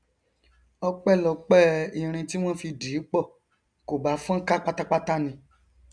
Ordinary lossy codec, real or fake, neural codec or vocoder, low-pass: none; real; none; 9.9 kHz